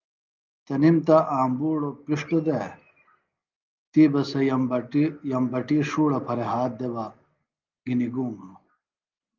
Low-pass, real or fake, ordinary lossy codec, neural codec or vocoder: 7.2 kHz; real; Opus, 24 kbps; none